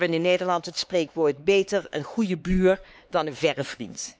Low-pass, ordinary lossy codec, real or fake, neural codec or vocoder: none; none; fake; codec, 16 kHz, 4 kbps, X-Codec, HuBERT features, trained on LibriSpeech